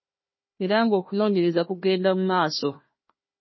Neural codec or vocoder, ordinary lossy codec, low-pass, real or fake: codec, 16 kHz, 1 kbps, FunCodec, trained on Chinese and English, 50 frames a second; MP3, 24 kbps; 7.2 kHz; fake